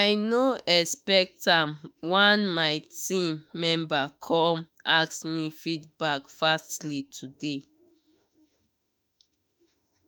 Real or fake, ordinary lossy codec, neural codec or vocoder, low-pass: fake; none; autoencoder, 48 kHz, 32 numbers a frame, DAC-VAE, trained on Japanese speech; none